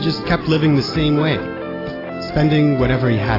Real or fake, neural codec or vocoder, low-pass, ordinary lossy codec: real; none; 5.4 kHz; AAC, 24 kbps